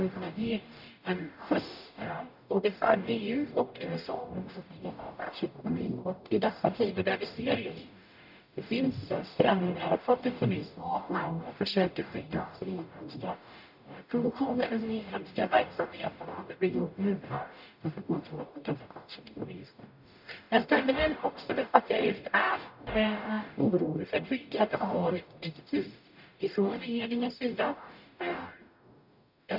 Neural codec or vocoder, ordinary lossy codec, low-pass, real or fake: codec, 44.1 kHz, 0.9 kbps, DAC; none; 5.4 kHz; fake